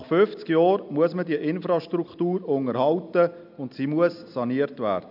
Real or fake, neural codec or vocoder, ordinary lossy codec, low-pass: real; none; AAC, 48 kbps; 5.4 kHz